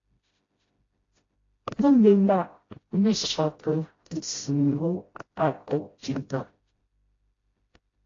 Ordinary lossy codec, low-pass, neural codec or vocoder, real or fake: AAC, 32 kbps; 7.2 kHz; codec, 16 kHz, 0.5 kbps, FreqCodec, smaller model; fake